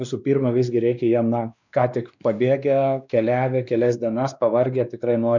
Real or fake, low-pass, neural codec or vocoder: fake; 7.2 kHz; codec, 16 kHz, 2 kbps, X-Codec, WavLM features, trained on Multilingual LibriSpeech